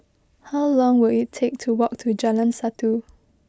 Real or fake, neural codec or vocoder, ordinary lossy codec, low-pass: real; none; none; none